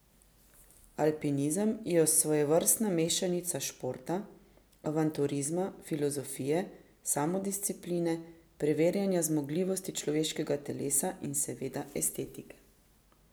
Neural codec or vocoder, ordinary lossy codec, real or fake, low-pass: none; none; real; none